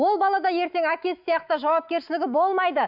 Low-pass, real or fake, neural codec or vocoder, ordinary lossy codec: 5.4 kHz; fake; autoencoder, 48 kHz, 128 numbers a frame, DAC-VAE, trained on Japanese speech; none